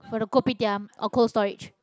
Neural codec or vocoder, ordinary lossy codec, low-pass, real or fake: none; none; none; real